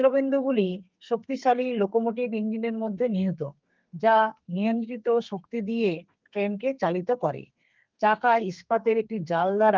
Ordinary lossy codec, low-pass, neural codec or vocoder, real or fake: Opus, 32 kbps; 7.2 kHz; codec, 32 kHz, 1.9 kbps, SNAC; fake